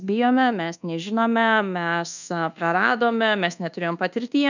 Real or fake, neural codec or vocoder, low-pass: fake; codec, 24 kHz, 1.2 kbps, DualCodec; 7.2 kHz